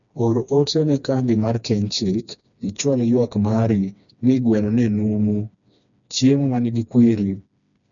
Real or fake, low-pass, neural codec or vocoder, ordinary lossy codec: fake; 7.2 kHz; codec, 16 kHz, 2 kbps, FreqCodec, smaller model; none